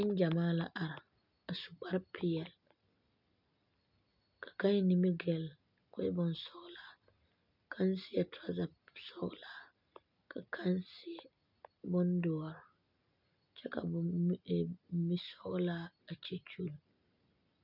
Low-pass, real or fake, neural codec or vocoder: 5.4 kHz; real; none